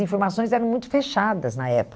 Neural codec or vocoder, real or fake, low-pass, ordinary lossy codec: none; real; none; none